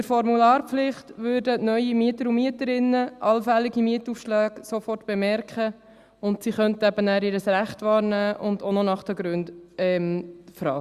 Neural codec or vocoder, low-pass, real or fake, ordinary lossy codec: none; 14.4 kHz; real; Opus, 64 kbps